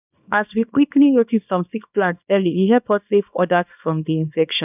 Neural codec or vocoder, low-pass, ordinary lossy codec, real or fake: codec, 24 kHz, 0.9 kbps, WavTokenizer, small release; 3.6 kHz; none; fake